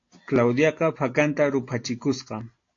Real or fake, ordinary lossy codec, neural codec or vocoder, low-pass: real; AAC, 48 kbps; none; 7.2 kHz